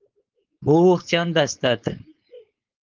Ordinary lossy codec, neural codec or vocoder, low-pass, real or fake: Opus, 32 kbps; codec, 16 kHz, 4.8 kbps, FACodec; 7.2 kHz; fake